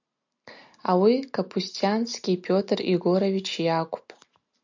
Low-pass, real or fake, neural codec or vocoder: 7.2 kHz; real; none